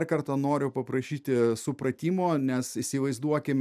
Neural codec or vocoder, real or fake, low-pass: none; real; 14.4 kHz